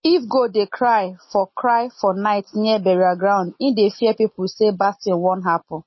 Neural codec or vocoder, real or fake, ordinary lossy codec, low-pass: none; real; MP3, 24 kbps; 7.2 kHz